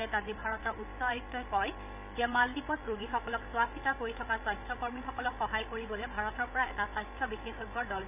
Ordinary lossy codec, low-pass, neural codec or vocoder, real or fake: none; 3.6 kHz; codec, 16 kHz, 16 kbps, FreqCodec, larger model; fake